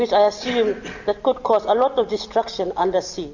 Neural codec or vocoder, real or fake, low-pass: none; real; 7.2 kHz